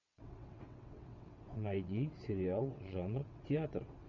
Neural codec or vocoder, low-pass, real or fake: vocoder, 22.05 kHz, 80 mel bands, WaveNeXt; 7.2 kHz; fake